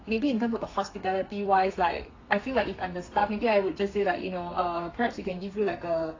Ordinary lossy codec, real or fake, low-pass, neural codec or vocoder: AAC, 32 kbps; fake; 7.2 kHz; codec, 32 kHz, 1.9 kbps, SNAC